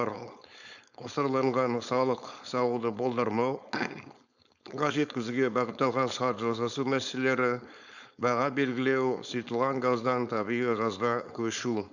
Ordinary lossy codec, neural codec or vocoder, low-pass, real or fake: none; codec, 16 kHz, 4.8 kbps, FACodec; 7.2 kHz; fake